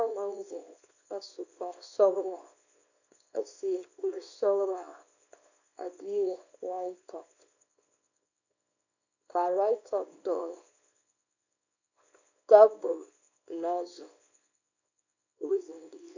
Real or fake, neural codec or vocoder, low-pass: fake; codec, 24 kHz, 0.9 kbps, WavTokenizer, small release; 7.2 kHz